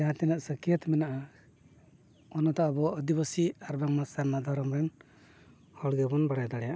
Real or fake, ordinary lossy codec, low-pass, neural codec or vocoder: real; none; none; none